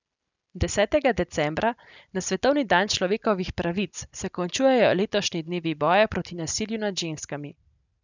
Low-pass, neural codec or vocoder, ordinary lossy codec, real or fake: 7.2 kHz; none; none; real